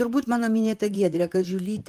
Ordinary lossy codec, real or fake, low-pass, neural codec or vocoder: Opus, 32 kbps; fake; 14.4 kHz; vocoder, 44.1 kHz, 128 mel bands, Pupu-Vocoder